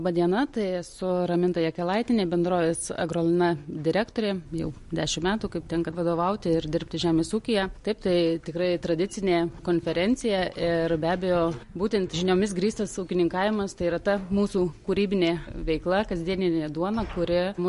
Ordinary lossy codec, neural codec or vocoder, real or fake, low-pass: MP3, 48 kbps; none; real; 14.4 kHz